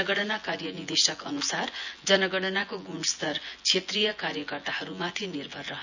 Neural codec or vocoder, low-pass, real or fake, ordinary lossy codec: vocoder, 24 kHz, 100 mel bands, Vocos; 7.2 kHz; fake; none